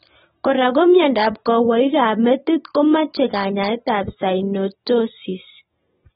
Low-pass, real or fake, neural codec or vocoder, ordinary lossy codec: 19.8 kHz; real; none; AAC, 16 kbps